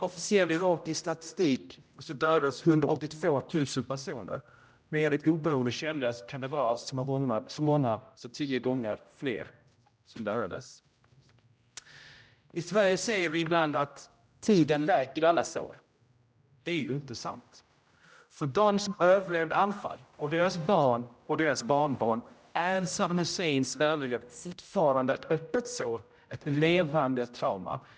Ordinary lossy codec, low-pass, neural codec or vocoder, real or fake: none; none; codec, 16 kHz, 0.5 kbps, X-Codec, HuBERT features, trained on general audio; fake